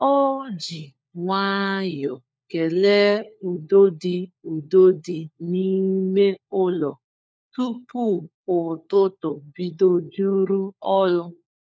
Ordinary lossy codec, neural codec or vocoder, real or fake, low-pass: none; codec, 16 kHz, 4 kbps, FunCodec, trained on LibriTTS, 50 frames a second; fake; none